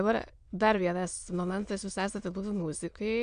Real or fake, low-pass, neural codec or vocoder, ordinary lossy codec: fake; 9.9 kHz; autoencoder, 22.05 kHz, a latent of 192 numbers a frame, VITS, trained on many speakers; MP3, 64 kbps